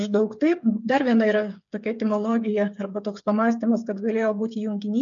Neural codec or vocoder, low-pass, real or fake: codec, 16 kHz, 8 kbps, FreqCodec, smaller model; 7.2 kHz; fake